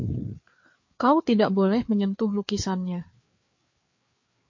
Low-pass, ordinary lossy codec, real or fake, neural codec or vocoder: 7.2 kHz; MP3, 48 kbps; fake; codec, 16 kHz, 4 kbps, FreqCodec, larger model